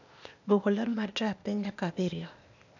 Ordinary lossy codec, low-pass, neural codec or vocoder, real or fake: none; 7.2 kHz; codec, 16 kHz, 0.8 kbps, ZipCodec; fake